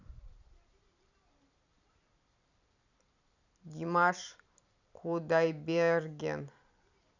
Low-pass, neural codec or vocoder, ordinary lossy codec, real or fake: 7.2 kHz; vocoder, 44.1 kHz, 128 mel bands every 256 samples, BigVGAN v2; none; fake